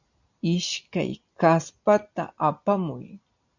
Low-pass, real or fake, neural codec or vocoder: 7.2 kHz; real; none